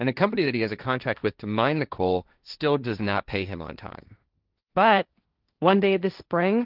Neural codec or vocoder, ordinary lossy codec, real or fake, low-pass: codec, 16 kHz, 1.1 kbps, Voila-Tokenizer; Opus, 24 kbps; fake; 5.4 kHz